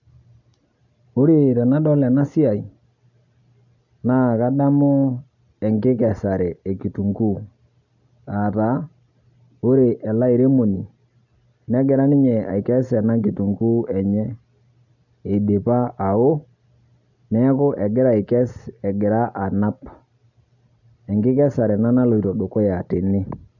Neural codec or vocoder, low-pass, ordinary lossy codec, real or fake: none; 7.2 kHz; none; real